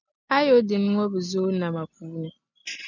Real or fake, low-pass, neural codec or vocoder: real; 7.2 kHz; none